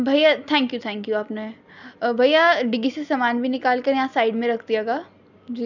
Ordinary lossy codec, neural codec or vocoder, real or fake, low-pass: none; none; real; 7.2 kHz